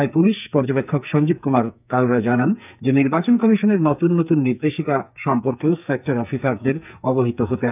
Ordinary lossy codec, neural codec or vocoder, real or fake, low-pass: none; codec, 44.1 kHz, 2.6 kbps, SNAC; fake; 3.6 kHz